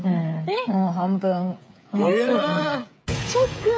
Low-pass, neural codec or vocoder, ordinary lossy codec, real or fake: none; codec, 16 kHz, 16 kbps, FreqCodec, smaller model; none; fake